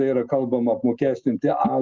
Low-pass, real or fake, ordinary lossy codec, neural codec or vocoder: 7.2 kHz; real; Opus, 24 kbps; none